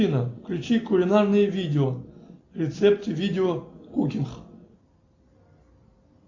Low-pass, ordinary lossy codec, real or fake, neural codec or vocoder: 7.2 kHz; AAC, 48 kbps; real; none